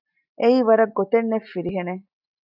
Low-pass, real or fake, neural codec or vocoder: 5.4 kHz; real; none